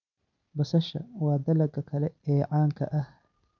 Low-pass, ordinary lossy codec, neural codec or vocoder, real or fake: 7.2 kHz; none; none; real